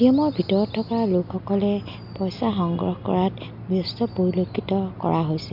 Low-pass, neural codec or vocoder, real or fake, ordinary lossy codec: 5.4 kHz; none; real; MP3, 48 kbps